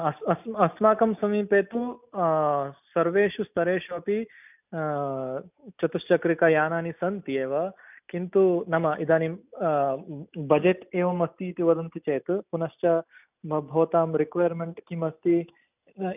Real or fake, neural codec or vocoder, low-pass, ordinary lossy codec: real; none; 3.6 kHz; none